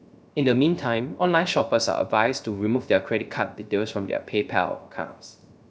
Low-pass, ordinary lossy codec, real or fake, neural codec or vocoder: none; none; fake; codec, 16 kHz, 0.3 kbps, FocalCodec